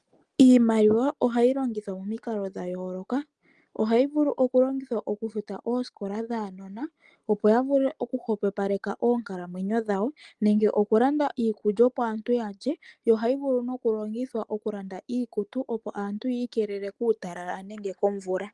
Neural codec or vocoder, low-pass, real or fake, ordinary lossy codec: none; 10.8 kHz; real; Opus, 24 kbps